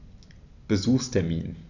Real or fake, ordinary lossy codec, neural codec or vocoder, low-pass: real; none; none; 7.2 kHz